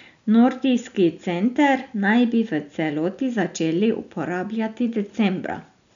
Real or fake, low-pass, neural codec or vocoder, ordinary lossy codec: real; 7.2 kHz; none; none